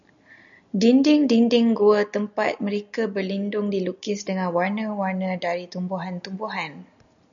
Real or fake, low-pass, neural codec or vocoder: real; 7.2 kHz; none